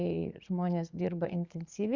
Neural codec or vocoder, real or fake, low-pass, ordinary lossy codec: vocoder, 44.1 kHz, 80 mel bands, Vocos; fake; 7.2 kHz; Opus, 64 kbps